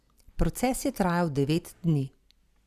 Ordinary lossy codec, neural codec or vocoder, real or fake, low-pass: Opus, 64 kbps; none; real; 14.4 kHz